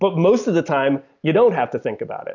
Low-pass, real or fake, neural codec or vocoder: 7.2 kHz; real; none